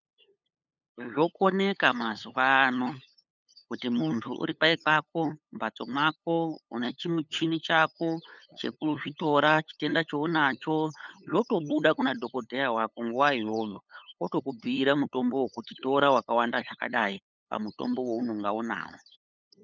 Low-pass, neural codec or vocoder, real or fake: 7.2 kHz; codec, 16 kHz, 8 kbps, FunCodec, trained on LibriTTS, 25 frames a second; fake